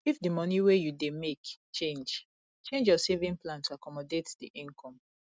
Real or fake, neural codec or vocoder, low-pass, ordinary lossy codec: real; none; none; none